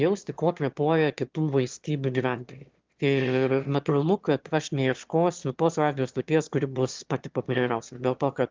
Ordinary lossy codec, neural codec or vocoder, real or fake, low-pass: Opus, 32 kbps; autoencoder, 22.05 kHz, a latent of 192 numbers a frame, VITS, trained on one speaker; fake; 7.2 kHz